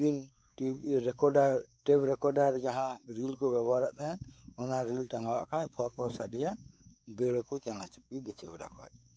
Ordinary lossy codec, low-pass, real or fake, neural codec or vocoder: none; none; fake; codec, 16 kHz, 4 kbps, X-Codec, WavLM features, trained on Multilingual LibriSpeech